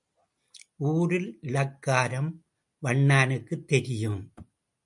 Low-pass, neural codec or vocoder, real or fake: 10.8 kHz; none; real